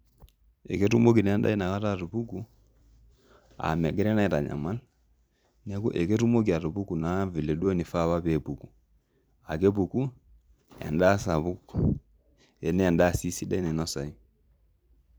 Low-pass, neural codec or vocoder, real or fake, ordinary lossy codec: none; vocoder, 44.1 kHz, 128 mel bands every 256 samples, BigVGAN v2; fake; none